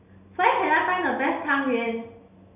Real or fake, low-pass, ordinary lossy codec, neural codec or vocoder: real; 3.6 kHz; none; none